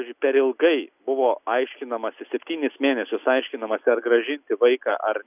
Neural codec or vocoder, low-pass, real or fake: none; 3.6 kHz; real